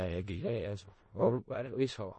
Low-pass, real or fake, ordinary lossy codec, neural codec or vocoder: 10.8 kHz; fake; MP3, 32 kbps; codec, 16 kHz in and 24 kHz out, 0.4 kbps, LongCat-Audio-Codec, four codebook decoder